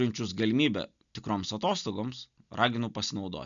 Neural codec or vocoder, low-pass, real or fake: none; 7.2 kHz; real